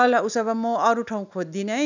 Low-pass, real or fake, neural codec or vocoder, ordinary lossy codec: 7.2 kHz; real; none; none